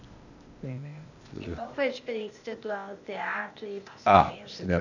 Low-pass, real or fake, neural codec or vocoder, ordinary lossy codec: 7.2 kHz; fake; codec, 16 kHz, 0.8 kbps, ZipCodec; none